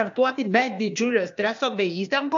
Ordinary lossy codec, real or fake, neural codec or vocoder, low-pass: AAC, 64 kbps; fake; codec, 16 kHz, 0.8 kbps, ZipCodec; 7.2 kHz